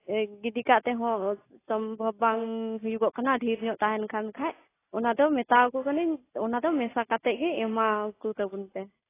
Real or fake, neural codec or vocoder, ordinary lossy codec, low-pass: real; none; AAC, 16 kbps; 3.6 kHz